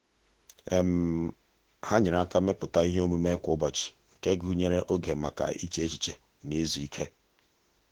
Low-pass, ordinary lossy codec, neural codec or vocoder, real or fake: 19.8 kHz; Opus, 16 kbps; autoencoder, 48 kHz, 32 numbers a frame, DAC-VAE, trained on Japanese speech; fake